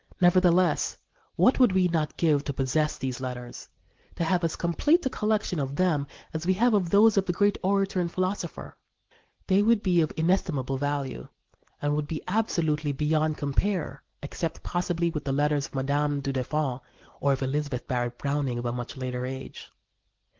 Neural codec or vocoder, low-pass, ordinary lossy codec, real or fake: none; 7.2 kHz; Opus, 16 kbps; real